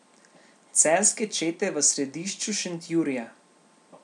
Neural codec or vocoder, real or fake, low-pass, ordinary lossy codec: none; real; 10.8 kHz; none